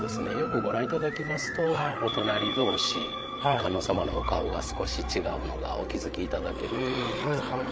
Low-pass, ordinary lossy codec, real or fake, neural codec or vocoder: none; none; fake; codec, 16 kHz, 8 kbps, FreqCodec, larger model